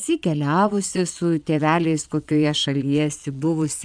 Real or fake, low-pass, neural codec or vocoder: fake; 9.9 kHz; vocoder, 22.05 kHz, 80 mel bands, Vocos